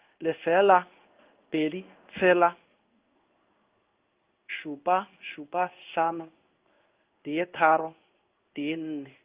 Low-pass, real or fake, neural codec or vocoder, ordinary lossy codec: 3.6 kHz; fake; codec, 16 kHz in and 24 kHz out, 1 kbps, XY-Tokenizer; Opus, 24 kbps